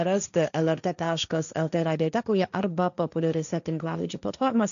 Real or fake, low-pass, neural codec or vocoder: fake; 7.2 kHz; codec, 16 kHz, 1.1 kbps, Voila-Tokenizer